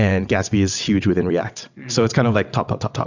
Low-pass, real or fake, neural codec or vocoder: 7.2 kHz; fake; vocoder, 22.05 kHz, 80 mel bands, WaveNeXt